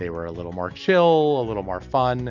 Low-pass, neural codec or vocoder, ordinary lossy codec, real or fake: 7.2 kHz; none; MP3, 64 kbps; real